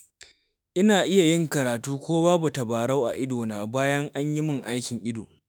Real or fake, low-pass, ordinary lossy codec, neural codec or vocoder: fake; none; none; autoencoder, 48 kHz, 32 numbers a frame, DAC-VAE, trained on Japanese speech